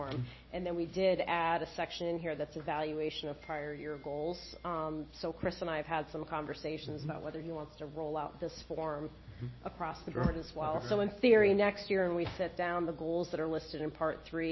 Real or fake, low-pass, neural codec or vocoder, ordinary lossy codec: real; 7.2 kHz; none; MP3, 24 kbps